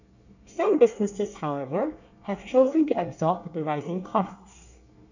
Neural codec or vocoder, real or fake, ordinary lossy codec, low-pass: codec, 24 kHz, 1 kbps, SNAC; fake; none; 7.2 kHz